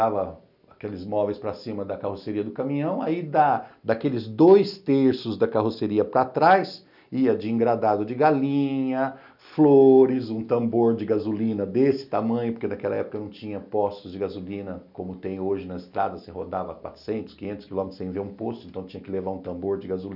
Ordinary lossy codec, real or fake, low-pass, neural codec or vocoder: none; real; 5.4 kHz; none